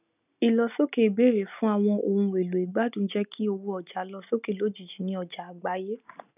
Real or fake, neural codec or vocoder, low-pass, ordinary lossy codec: real; none; 3.6 kHz; none